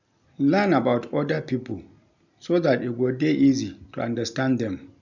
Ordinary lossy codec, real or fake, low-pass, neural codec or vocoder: none; real; 7.2 kHz; none